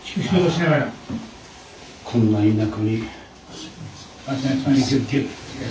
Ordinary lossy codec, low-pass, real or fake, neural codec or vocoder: none; none; real; none